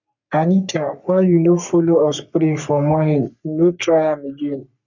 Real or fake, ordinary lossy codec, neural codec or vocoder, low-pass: fake; none; codec, 44.1 kHz, 3.4 kbps, Pupu-Codec; 7.2 kHz